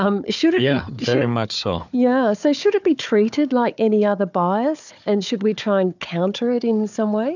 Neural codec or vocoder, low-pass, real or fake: codec, 16 kHz, 4 kbps, FunCodec, trained on Chinese and English, 50 frames a second; 7.2 kHz; fake